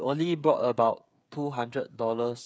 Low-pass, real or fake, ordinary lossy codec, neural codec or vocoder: none; fake; none; codec, 16 kHz, 8 kbps, FreqCodec, smaller model